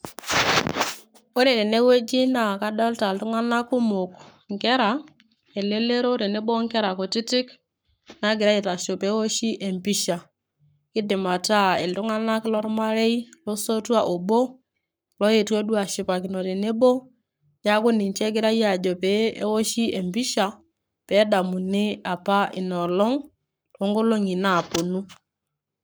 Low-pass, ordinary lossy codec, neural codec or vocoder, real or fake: none; none; codec, 44.1 kHz, 7.8 kbps, Pupu-Codec; fake